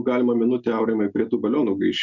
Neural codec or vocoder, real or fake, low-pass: none; real; 7.2 kHz